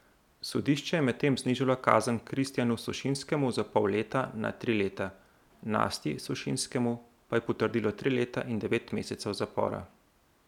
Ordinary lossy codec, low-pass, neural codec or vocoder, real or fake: none; 19.8 kHz; none; real